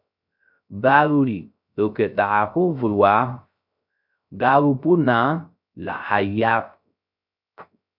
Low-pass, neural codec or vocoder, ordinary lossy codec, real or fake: 5.4 kHz; codec, 16 kHz, 0.3 kbps, FocalCodec; MP3, 48 kbps; fake